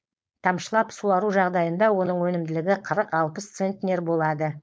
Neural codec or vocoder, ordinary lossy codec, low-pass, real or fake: codec, 16 kHz, 4.8 kbps, FACodec; none; none; fake